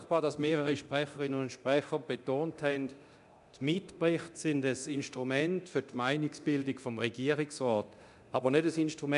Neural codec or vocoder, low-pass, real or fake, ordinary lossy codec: codec, 24 kHz, 0.9 kbps, DualCodec; 10.8 kHz; fake; none